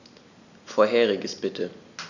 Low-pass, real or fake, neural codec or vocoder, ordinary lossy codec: 7.2 kHz; real; none; none